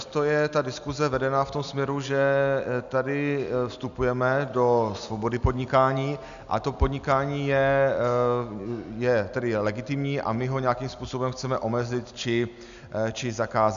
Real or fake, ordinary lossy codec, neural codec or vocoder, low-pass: real; MP3, 96 kbps; none; 7.2 kHz